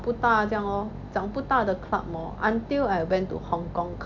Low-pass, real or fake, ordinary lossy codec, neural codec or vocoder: 7.2 kHz; real; none; none